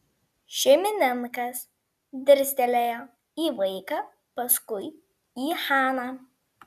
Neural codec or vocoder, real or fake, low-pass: none; real; 14.4 kHz